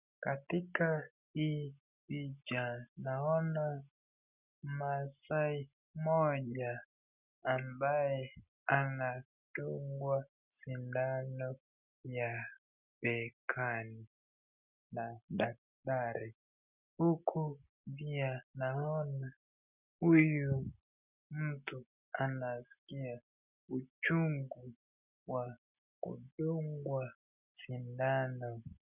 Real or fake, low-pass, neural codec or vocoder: real; 3.6 kHz; none